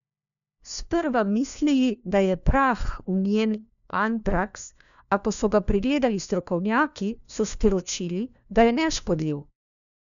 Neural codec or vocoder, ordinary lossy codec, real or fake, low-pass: codec, 16 kHz, 1 kbps, FunCodec, trained on LibriTTS, 50 frames a second; none; fake; 7.2 kHz